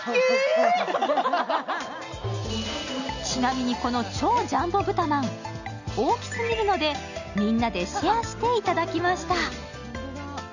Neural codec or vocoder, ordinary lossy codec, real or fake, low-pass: none; none; real; 7.2 kHz